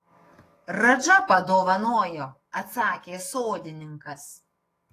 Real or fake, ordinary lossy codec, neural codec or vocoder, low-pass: fake; AAC, 48 kbps; codec, 44.1 kHz, 7.8 kbps, DAC; 14.4 kHz